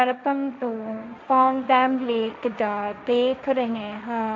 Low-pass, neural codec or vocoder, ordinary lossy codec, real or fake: none; codec, 16 kHz, 1.1 kbps, Voila-Tokenizer; none; fake